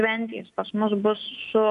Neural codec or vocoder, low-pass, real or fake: none; 10.8 kHz; real